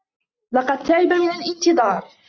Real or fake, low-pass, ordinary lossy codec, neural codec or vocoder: real; 7.2 kHz; Opus, 64 kbps; none